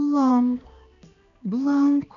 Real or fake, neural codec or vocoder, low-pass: fake; codec, 16 kHz, 2 kbps, X-Codec, HuBERT features, trained on balanced general audio; 7.2 kHz